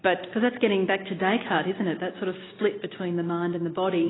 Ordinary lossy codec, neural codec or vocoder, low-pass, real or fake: AAC, 16 kbps; none; 7.2 kHz; real